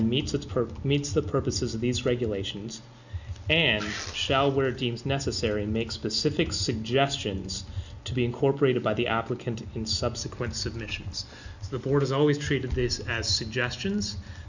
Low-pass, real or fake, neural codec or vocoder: 7.2 kHz; real; none